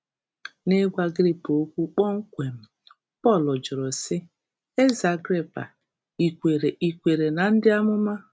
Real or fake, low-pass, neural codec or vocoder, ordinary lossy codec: real; none; none; none